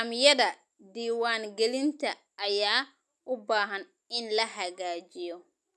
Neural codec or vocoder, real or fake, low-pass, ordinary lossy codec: none; real; none; none